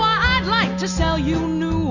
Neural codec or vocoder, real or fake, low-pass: none; real; 7.2 kHz